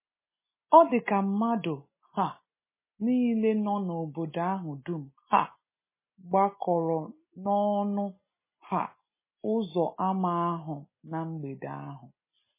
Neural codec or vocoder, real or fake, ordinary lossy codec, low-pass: none; real; MP3, 16 kbps; 3.6 kHz